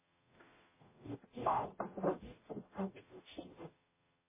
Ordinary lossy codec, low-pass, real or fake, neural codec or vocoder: MP3, 16 kbps; 3.6 kHz; fake; codec, 44.1 kHz, 0.9 kbps, DAC